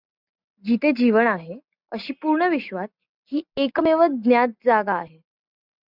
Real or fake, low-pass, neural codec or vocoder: real; 5.4 kHz; none